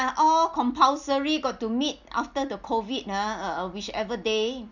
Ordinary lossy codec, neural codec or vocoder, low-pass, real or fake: none; none; 7.2 kHz; real